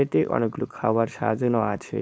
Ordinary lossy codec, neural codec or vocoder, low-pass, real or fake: none; codec, 16 kHz, 4.8 kbps, FACodec; none; fake